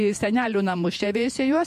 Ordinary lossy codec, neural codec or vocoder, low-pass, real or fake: MP3, 64 kbps; vocoder, 48 kHz, 128 mel bands, Vocos; 14.4 kHz; fake